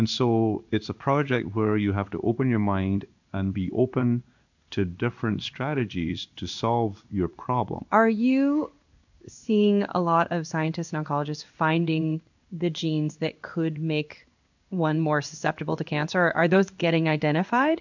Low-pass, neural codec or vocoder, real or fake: 7.2 kHz; codec, 16 kHz in and 24 kHz out, 1 kbps, XY-Tokenizer; fake